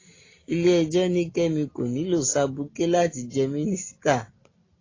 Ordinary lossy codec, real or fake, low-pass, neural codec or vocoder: AAC, 32 kbps; real; 7.2 kHz; none